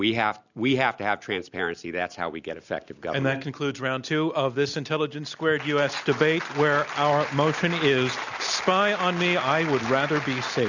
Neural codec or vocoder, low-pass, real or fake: none; 7.2 kHz; real